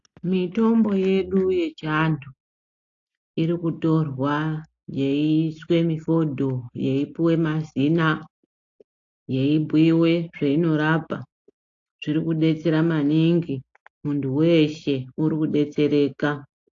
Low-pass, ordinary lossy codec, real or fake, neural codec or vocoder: 7.2 kHz; AAC, 48 kbps; real; none